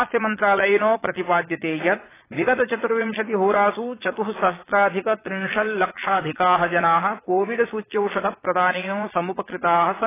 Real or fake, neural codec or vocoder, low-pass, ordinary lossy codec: fake; vocoder, 22.05 kHz, 80 mel bands, Vocos; 3.6 kHz; AAC, 16 kbps